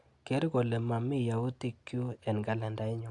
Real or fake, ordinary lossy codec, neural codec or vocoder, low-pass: real; AAC, 64 kbps; none; 10.8 kHz